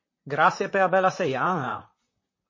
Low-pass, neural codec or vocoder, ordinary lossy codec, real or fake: 7.2 kHz; vocoder, 44.1 kHz, 128 mel bands, Pupu-Vocoder; MP3, 32 kbps; fake